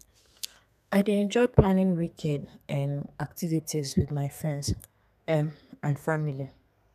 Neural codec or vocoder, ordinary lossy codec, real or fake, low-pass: codec, 32 kHz, 1.9 kbps, SNAC; none; fake; 14.4 kHz